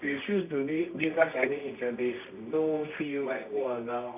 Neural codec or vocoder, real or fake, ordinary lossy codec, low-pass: codec, 24 kHz, 0.9 kbps, WavTokenizer, medium music audio release; fake; none; 3.6 kHz